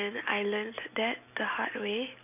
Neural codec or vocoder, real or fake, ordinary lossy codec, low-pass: none; real; none; 3.6 kHz